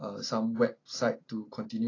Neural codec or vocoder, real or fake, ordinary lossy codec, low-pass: none; real; AAC, 32 kbps; 7.2 kHz